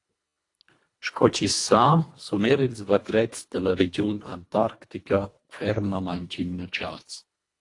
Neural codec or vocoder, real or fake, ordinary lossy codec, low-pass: codec, 24 kHz, 1.5 kbps, HILCodec; fake; AAC, 48 kbps; 10.8 kHz